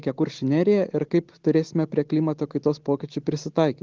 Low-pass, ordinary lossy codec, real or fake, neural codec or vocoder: 7.2 kHz; Opus, 16 kbps; real; none